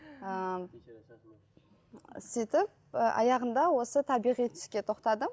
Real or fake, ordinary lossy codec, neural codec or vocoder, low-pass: real; none; none; none